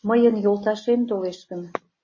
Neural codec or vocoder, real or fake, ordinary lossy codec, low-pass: none; real; MP3, 32 kbps; 7.2 kHz